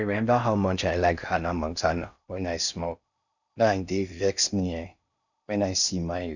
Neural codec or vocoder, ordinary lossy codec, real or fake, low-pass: codec, 16 kHz in and 24 kHz out, 0.6 kbps, FocalCodec, streaming, 4096 codes; none; fake; 7.2 kHz